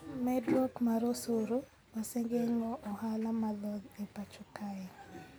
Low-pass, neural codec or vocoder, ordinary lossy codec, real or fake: none; none; none; real